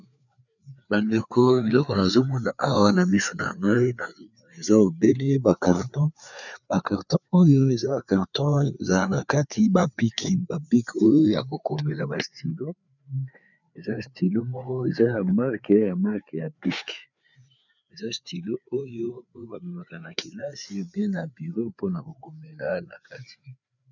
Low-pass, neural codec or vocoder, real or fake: 7.2 kHz; codec, 16 kHz, 4 kbps, FreqCodec, larger model; fake